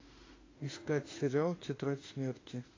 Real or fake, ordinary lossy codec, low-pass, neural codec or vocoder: fake; AAC, 32 kbps; 7.2 kHz; autoencoder, 48 kHz, 32 numbers a frame, DAC-VAE, trained on Japanese speech